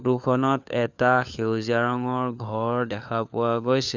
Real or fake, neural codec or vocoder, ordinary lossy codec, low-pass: fake; codec, 16 kHz, 4 kbps, FunCodec, trained on LibriTTS, 50 frames a second; none; 7.2 kHz